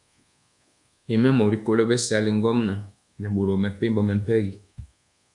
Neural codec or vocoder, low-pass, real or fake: codec, 24 kHz, 1.2 kbps, DualCodec; 10.8 kHz; fake